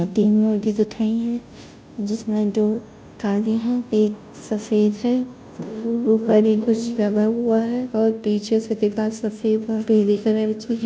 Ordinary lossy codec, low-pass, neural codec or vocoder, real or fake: none; none; codec, 16 kHz, 0.5 kbps, FunCodec, trained on Chinese and English, 25 frames a second; fake